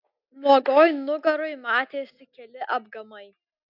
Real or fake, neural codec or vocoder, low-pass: real; none; 5.4 kHz